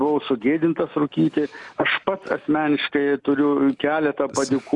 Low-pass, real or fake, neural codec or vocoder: 10.8 kHz; real; none